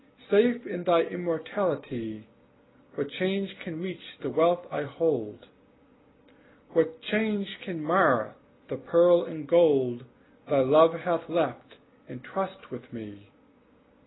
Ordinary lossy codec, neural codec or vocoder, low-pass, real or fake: AAC, 16 kbps; none; 7.2 kHz; real